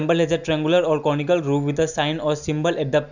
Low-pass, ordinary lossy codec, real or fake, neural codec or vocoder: 7.2 kHz; none; real; none